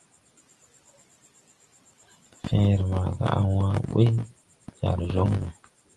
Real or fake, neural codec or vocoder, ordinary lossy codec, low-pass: real; none; Opus, 24 kbps; 10.8 kHz